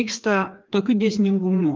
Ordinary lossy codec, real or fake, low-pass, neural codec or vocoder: Opus, 32 kbps; fake; 7.2 kHz; codec, 16 kHz, 2 kbps, FreqCodec, larger model